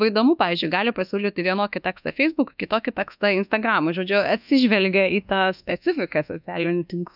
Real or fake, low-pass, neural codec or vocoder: fake; 5.4 kHz; codec, 24 kHz, 1.2 kbps, DualCodec